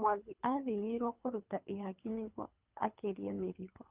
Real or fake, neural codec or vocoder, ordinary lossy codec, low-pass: fake; codec, 24 kHz, 3 kbps, HILCodec; Opus, 32 kbps; 3.6 kHz